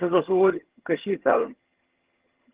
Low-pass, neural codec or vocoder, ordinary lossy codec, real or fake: 3.6 kHz; vocoder, 22.05 kHz, 80 mel bands, HiFi-GAN; Opus, 16 kbps; fake